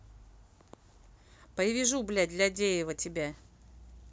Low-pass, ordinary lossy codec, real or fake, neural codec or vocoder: none; none; real; none